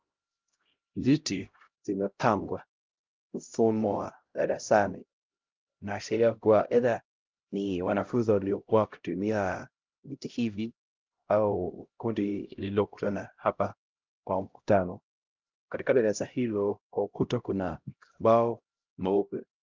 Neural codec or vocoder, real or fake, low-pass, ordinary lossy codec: codec, 16 kHz, 0.5 kbps, X-Codec, HuBERT features, trained on LibriSpeech; fake; 7.2 kHz; Opus, 32 kbps